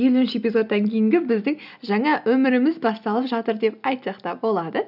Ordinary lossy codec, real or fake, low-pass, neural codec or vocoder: none; real; 5.4 kHz; none